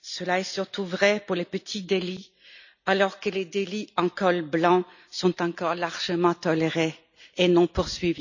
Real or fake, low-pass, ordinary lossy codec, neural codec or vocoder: real; 7.2 kHz; none; none